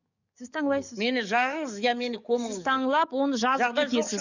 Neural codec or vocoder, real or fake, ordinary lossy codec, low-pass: codec, 44.1 kHz, 7.8 kbps, DAC; fake; none; 7.2 kHz